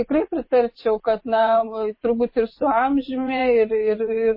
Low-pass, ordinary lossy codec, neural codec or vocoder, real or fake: 5.4 kHz; MP3, 24 kbps; vocoder, 24 kHz, 100 mel bands, Vocos; fake